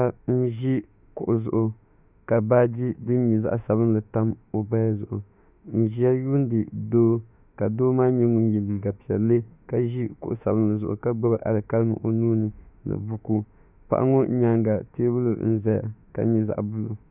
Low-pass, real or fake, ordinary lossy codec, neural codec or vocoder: 3.6 kHz; fake; Opus, 64 kbps; autoencoder, 48 kHz, 32 numbers a frame, DAC-VAE, trained on Japanese speech